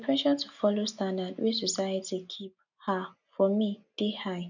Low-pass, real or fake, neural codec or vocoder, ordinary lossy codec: 7.2 kHz; real; none; none